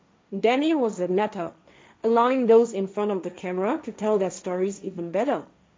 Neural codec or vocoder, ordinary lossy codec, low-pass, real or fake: codec, 16 kHz, 1.1 kbps, Voila-Tokenizer; none; none; fake